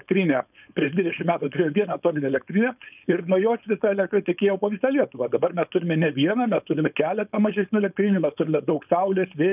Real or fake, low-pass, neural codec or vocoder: fake; 3.6 kHz; codec, 16 kHz, 4.8 kbps, FACodec